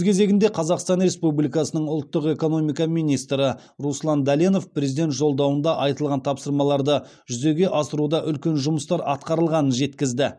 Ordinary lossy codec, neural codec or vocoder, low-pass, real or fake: none; none; none; real